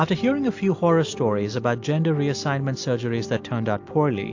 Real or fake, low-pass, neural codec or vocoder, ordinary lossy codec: real; 7.2 kHz; none; AAC, 48 kbps